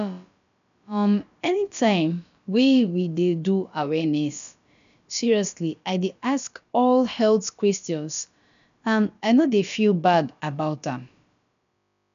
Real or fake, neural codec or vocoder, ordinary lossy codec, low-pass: fake; codec, 16 kHz, about 1 kbps, DyCAST, with the encoder's durations; none; 7.2 kHz